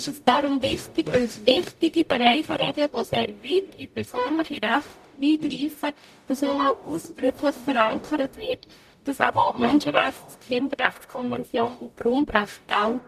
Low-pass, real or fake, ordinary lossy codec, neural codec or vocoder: 14.4 kHz; fake; none; codec, 44.1 kHz, 0.9 kbps, DAC